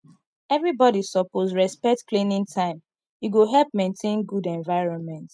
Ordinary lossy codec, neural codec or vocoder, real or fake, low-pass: none; none; real; none